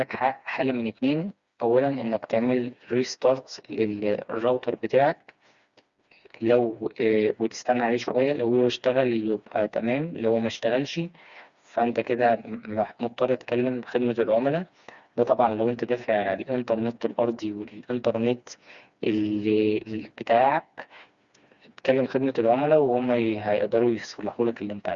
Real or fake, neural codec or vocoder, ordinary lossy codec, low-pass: fake; codec, 16 kHz, 2 kbps, FreqCodec, smaller model; Opus, 64 kbps; 7.2 kHz